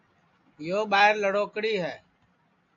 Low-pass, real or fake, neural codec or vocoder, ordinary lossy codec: 7.2 kHz; real; none; AAC, 64 kbps